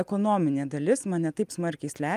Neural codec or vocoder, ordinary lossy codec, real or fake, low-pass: none; Opus, 32 kbps; real; 14.4 kHz